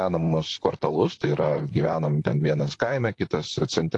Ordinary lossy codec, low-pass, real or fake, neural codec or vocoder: AAC, 48 kbps; 10.8 kHz; fake; vocoder, 44.1 kHz, 128 mel bands, Pupu-Vocoder